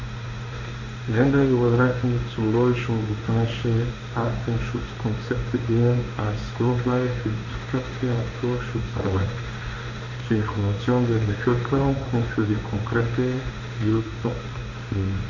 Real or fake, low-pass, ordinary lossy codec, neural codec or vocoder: fake; 7.2 kHz; none; codec, 16 kHz in and 24 kHz out, 1 kbps, XY-Tokenizer